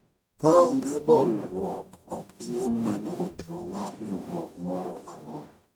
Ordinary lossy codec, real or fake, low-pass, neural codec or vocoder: none; fake; 19.8 kHz; codec, 44.1 kHz, 0.9 kbps, DAC